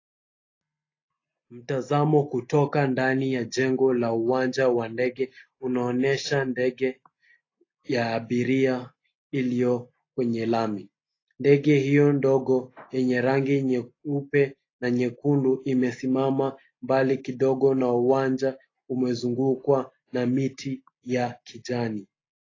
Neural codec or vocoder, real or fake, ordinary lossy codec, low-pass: none; real; AAC, 32 kbps; 7.2 kHz